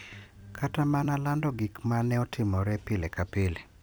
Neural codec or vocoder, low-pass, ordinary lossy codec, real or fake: vocoder, 44.1 kHz, 128 mel bands every 256 samples, BigVGAN v2; none; none; fake